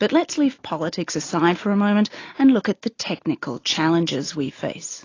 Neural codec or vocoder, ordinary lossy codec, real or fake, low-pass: none; AAC, 32 kbps; real; 7.2 kHz